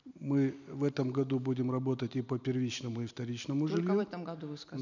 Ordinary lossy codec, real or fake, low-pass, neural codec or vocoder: none; real; 7.2 kHz; none